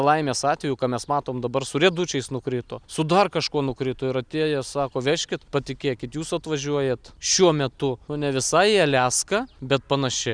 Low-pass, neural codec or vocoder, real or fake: 9.9 kHz; none; real